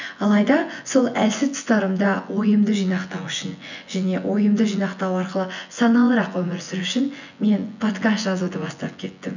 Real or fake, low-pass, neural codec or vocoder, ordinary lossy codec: fake; 7.2 kHz; vocoder, 24 kHz, 100 mel bands, Vocos; none